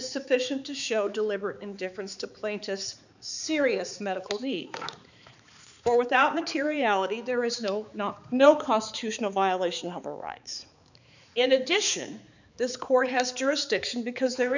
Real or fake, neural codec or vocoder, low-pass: fake; codec, 16 kHz, 4 kbps, X-Codec, HuBERT features, trained on balanced general audio; 7.2 kHz